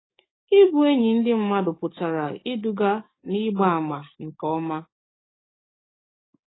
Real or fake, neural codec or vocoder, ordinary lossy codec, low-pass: fake; codec, 44.1 kHz, 7.8 kbps, DAC; AAC, 16 kbps; 7.2 kHz